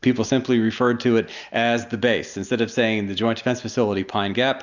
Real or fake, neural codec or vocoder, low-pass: real; none; 7.2 kHz